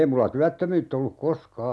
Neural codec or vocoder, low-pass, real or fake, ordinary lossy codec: none; none; real; none